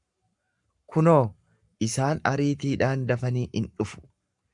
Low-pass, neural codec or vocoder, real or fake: 10.8 kHz; codec, 44.1 kHz, 7.8 kbps, Pupu-Codec; fake